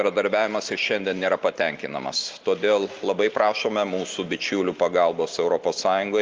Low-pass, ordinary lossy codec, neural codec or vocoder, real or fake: 7.2 kHz; Opus, 32 kbps; none; real